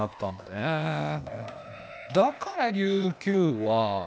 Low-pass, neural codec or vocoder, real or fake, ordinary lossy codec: none; codec, 16 kHz, 0.8 kbps, ZipCodec; fake; none